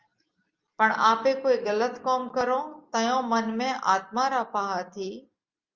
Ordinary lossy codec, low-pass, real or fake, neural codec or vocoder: Opus, 32 kbps; 7.2 kHz; real; none